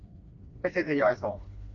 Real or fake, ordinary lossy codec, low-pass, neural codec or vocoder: fake; AAC, 64 kbps; 7.2 kHz; codec, 16 kHz, 2 kbps, FreqCodec, smaller model